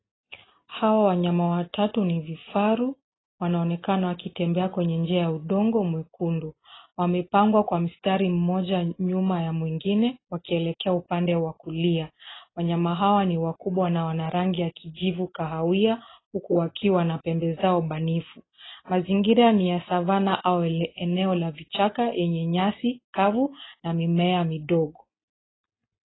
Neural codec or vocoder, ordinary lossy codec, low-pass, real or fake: none; AAC, 16 kbps; 7.2 kHz; real